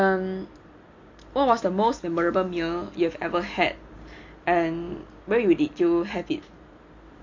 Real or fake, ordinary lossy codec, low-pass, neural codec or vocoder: real; MP3, 48 kbps; 7.2 kHz; none